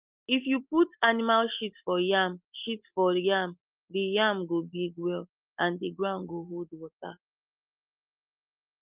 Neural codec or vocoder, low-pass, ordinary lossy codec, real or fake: none; 3.6 kHz; Opus, 32 kbps; real